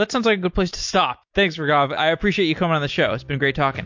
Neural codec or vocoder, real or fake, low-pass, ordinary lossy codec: none; real; 7.2 kHz; MP3, 48 kbps